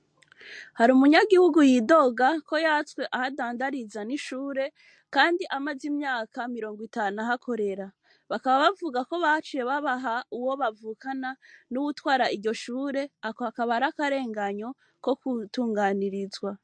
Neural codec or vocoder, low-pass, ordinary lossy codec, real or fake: none; 9.9 kHz; MP3, 48 kbps; real